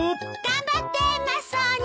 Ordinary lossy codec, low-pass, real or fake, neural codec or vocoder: none; none; real; none